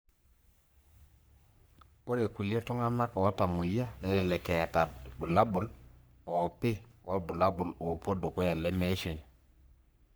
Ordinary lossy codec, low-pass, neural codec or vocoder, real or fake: none; none; codec, 44.1 kHz, 3.4 kbps, Pupu-Codec; fake